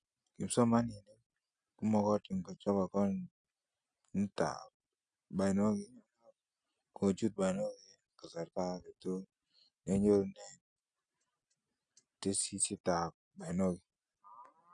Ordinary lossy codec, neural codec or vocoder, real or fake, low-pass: none; none; real; 9.9 kHz